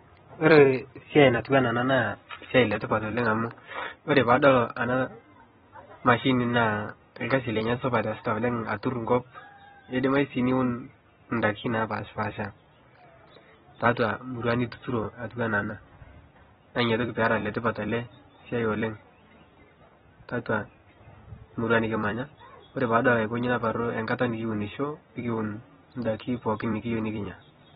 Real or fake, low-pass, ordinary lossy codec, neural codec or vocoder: fake; 19.8 kHz; AAC, 16 kbps; vocoder, 44.1 kHz, 128 mel bands every 256 samples, BigVGAN v2